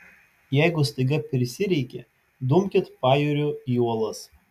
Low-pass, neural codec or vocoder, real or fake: 14.4 kHz; none; real